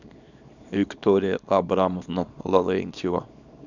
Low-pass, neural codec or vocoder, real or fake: 7.2 kHz; codec, 24 kHz, 0.9 kbps, WavTokenizer, small release; fake